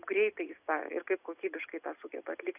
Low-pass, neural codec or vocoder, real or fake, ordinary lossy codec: 3.6 kHz; none; real; AAC, 32 kbps